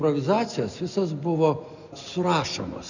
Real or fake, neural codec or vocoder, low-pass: real; none; 7.2 kHz